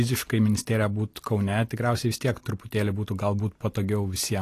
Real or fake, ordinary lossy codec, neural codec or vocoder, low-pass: real; AAC, 48 kbps; none; 14.4 kHz